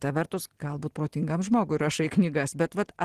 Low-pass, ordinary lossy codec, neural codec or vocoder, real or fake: 14.4 kHz; Opus, 16 kbps; none; real